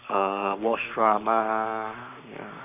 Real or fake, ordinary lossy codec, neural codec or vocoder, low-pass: fake; none; codec, 44.1 kHz, 7.8 kbps, Pupu-Codec; 3.6 kHz